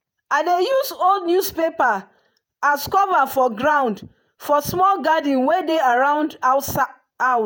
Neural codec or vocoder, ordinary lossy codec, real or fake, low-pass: vocoder, 48 kHz, 128 mel bands, Vocos; none; fake; none